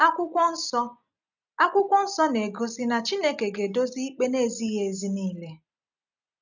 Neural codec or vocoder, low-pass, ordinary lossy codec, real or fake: none; 7.2 kHz; none; real